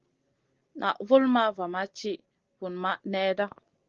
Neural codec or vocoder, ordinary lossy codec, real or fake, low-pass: none; Opus, 16 kbps; real; 7.2 kHz